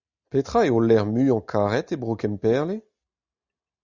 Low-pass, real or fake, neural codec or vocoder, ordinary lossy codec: 7.2 kHz; real; none; Opus, 64 kbps